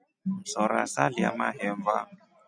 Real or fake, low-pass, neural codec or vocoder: real; 9.9 kHz; none